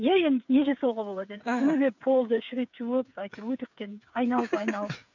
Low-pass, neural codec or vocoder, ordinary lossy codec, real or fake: 7.2 kHz; codec, 16 kHz, 8 kbps, FreqCodec, smaller model; none; fake